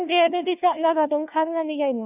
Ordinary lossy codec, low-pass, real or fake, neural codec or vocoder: none; 3.6 kHz; fake; codec, 16 kHz, 1 kbps, FunCodec, trained on LibriTTS, 50 frames a second